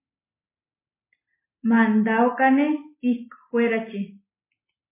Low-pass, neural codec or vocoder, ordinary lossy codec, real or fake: 3.6 kHz; none; MP3, 16 kbps; real